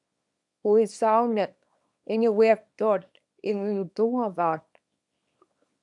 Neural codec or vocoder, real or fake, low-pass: codec, 24 kHz, 0.9 kbps, WavTokenizer, small release; fake; 10.8 kHz